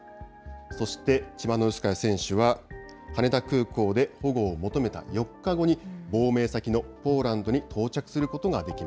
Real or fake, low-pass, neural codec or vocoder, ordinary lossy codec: real; none; none; none